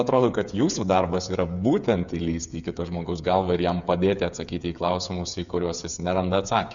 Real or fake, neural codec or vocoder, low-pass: fake; codec, 16 kHz, 8 kbps, FreqCodec, smaller model; 7.2 kHz